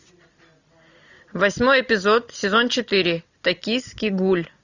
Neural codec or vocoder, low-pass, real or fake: none; 7.2 kHz; real